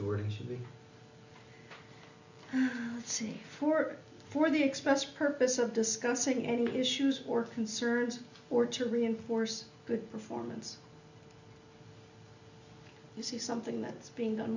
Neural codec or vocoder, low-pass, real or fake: none; 7.2 kHz; real